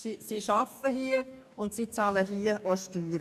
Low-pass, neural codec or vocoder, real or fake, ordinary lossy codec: 14.4 kHz; codec, 44.1 kHz, 2.6 kbps, DAC; fake; none